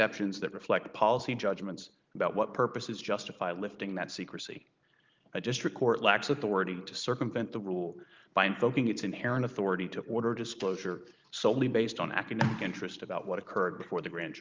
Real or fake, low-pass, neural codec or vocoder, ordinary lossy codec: fake; 7.2 kHz; vocoder, 44.1 kHz, 128 mel bands every 512 samples, BigVGAN v2; Opus, 32 kbps